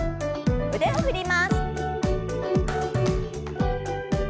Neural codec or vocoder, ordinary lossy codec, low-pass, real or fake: none; none; none; real